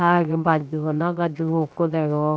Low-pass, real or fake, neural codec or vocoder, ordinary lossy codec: none; fake; codec, 16 kHz, 0.7 kbps, FocalCodec; none